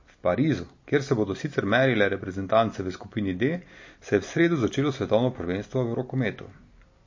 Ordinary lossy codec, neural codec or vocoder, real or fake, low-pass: MP3, 32 kbps; none; real; 7.2 kHz